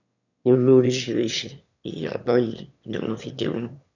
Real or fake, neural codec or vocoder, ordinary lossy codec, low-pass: fake; autoencoder, 22.05 kHz, a latent of 192 numbers a frame, VITS, trained on one speaker; none; 7.2 kHz